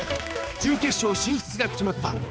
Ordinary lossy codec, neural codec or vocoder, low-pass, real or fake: none; codec, 16 kHz, 2 kbps, X-Codec, HuBERT features, trained on balanced general audio; none; fake